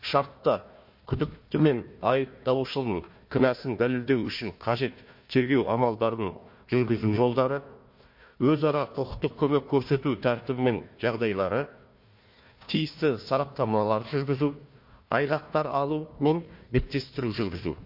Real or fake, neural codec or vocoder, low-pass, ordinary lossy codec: fake; codec, 16 kHz, 1 kbps, FunCodec, trained on Chinese and English, 50 frames a second; 5.4 kHz; MP3, 32 kbps